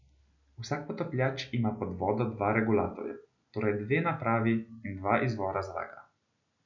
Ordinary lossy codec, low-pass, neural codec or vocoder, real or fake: none; 7.2 kHz; none; real